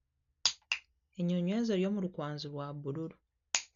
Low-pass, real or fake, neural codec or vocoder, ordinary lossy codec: 7.2 kHz; real; none; none